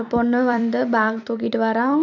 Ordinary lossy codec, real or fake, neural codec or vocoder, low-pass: none; fake; vocoder, 44.1 kHz, 128 mel bands every 256 samples, BigVGAN v2; 7.2 kHz